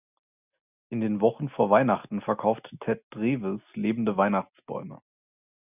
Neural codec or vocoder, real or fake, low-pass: none; real; 3.6 kHz